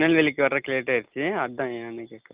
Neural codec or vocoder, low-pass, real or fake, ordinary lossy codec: none; 3.6 kHz; real; Opus, 24 kbps